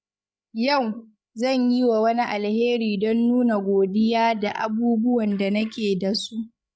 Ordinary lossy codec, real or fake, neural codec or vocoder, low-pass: none; fake; codec, 16 kHz, 16 kbps, FreqCodec, larger model; none